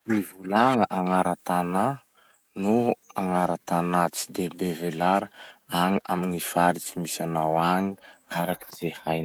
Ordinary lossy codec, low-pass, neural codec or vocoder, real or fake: none; none; codec, 44.1 kHz, 7.8 kbps, DAC; fake